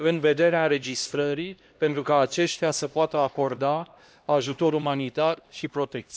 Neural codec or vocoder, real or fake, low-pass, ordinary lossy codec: codec, 16 kHz, 1 kbps, X-Codec, HuBERT features, trained on LibriSpeech; fake; none; none